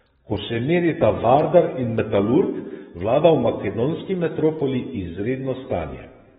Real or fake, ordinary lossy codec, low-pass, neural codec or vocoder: fake; AAC, 16 kbps; 19.8 kHz; codec, 44.1 kHz, 7.8 kbps, DAC